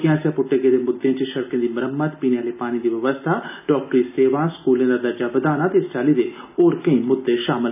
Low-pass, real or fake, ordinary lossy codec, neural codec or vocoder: 3.6 kHz; real; none; none